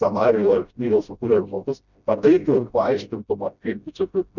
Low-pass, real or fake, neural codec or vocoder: 7.2 kHz; fake; codec, 16 kHz, 0.5 kbps, FreqCodec, smaller model